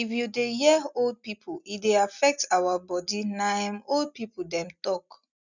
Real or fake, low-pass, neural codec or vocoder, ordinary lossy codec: real; 7.2 kHz; none; none